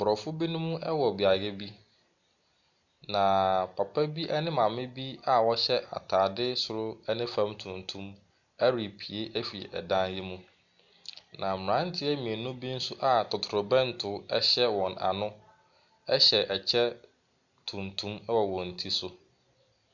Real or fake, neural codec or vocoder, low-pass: real; none; 7.2 kHz